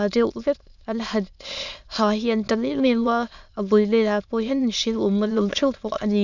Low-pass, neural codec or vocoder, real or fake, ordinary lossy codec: 7.2 kHz; autoencoder, 22.05 kHz, a latent of 192 numbers a frame, VITS, trained on many speakers; fake; none